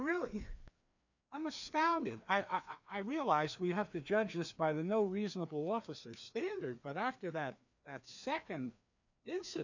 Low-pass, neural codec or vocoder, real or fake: 7.2 kHz; codec, 16 kHz, 2 kbps, FreqCodec, larger model; fake